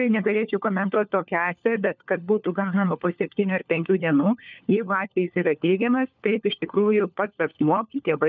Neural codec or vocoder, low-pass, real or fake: codec, 16 kHz, 4 kbps, FunCodec, trained on LibriTTS, 50 frames a second; 7.2 kHz; fake